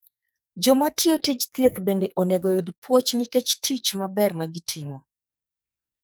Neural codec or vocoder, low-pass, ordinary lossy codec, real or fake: codec, 44.1 kHz, 2.6 kbps, SNAC; none; none; fake